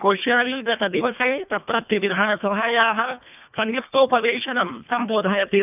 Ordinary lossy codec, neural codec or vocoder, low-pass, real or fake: none; codec, 24 kHz, 1.5 kbps, HILCodec; 3.6 kHz; fake